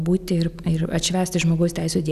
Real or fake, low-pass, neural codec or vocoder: real; 14.4 kHz; none